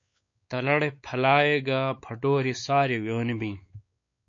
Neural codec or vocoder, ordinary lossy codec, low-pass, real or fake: codec, 16 kHz, 4 kbps, X-Codec, WavLM features, trained on Multilingual LibriSpeech; MP3, 48 kbps; 7.2 kHz; fake